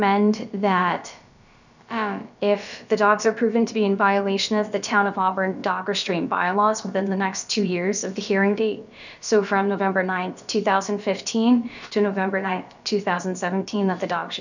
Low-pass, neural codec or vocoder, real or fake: 7.2 kHz; codec, 16 kHz, about 1 kbps, DyCAST, with the encoder's durations; fake